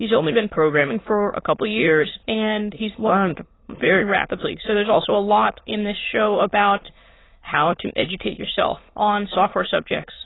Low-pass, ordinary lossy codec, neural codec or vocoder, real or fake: 7.2 kHz; AAC, 16 kbps; autoencoder, 22.05 kHz, a latent of 192 numbers a frame, VITS, trained on many speakers; fake